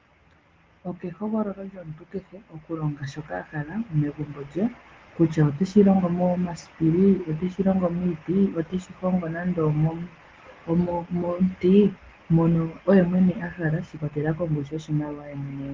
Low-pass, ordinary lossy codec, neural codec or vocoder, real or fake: 7.2 kHz; Opus, 16 kbps; none; real